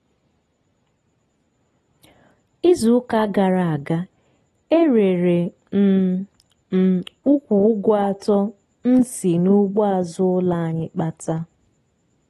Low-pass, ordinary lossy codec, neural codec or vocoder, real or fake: 19.8 kHz; AAC, 32 kbps; none; real